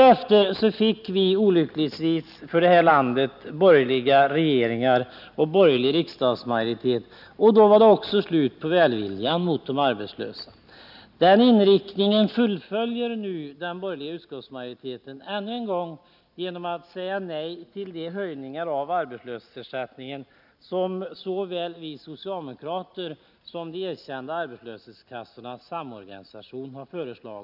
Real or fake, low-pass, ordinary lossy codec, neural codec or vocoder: real; 5.4 kHz; none; none